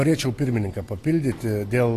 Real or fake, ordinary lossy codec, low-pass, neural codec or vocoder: real; AAC, 48 kbps; 14.4 kHz; none